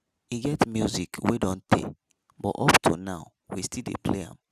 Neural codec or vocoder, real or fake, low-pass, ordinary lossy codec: none; real; 14.4 kHz; none